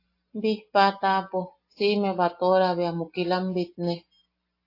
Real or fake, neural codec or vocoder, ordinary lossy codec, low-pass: real; none; AAC, 32 kbps; 5.4 kHz